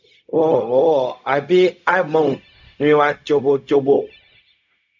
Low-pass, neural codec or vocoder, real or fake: 7.2 kHz; codec, 16 kHz, 0.4 kbps, LongCat-Audio-Codec; fake